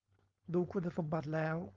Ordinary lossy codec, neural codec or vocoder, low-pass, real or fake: Opus, 32 kbps; codec, 16 kHz, 4.8 kbps, FACodec; 7.2 kHz; fake